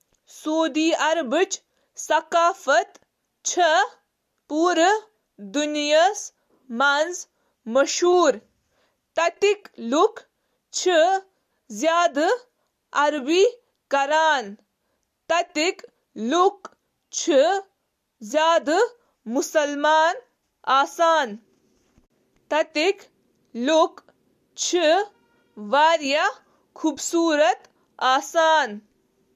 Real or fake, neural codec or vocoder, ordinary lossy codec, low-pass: real; none; MP3, 64 kbps; 14.4 kHz